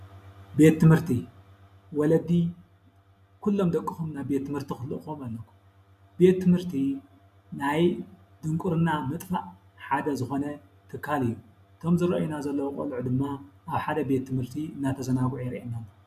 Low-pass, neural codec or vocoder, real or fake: 14.4 kHz; none; real